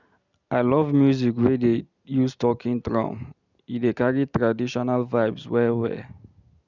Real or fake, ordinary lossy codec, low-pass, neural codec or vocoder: real; none; 7.2 kHz; none